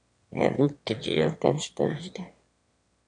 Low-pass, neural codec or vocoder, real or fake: 9.9 kHz; autoencoder, 22.05 kHz, a latent of 192 numbers a frame, VITS, trained on one speaker; fake